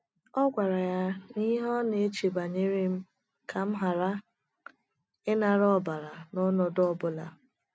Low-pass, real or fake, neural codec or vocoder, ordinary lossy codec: none; real; none; none